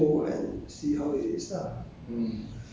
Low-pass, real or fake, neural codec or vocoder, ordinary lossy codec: none; real; none; none